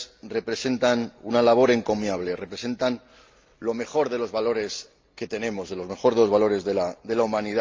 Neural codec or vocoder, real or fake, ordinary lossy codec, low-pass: none; real; Opus, 24 kbps; 7.2 kHz